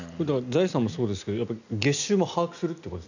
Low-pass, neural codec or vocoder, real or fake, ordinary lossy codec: 7.2 kHz; none; real; none